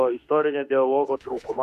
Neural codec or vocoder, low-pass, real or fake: autoencoder, 48 kHz, 32 numbers a frame, DAC-VAE, trained on Japanese speech; 14.4 kHz; fake